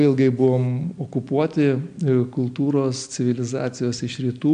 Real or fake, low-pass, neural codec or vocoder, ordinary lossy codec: real; 9.9 kHz; none; MP3, 64 kbps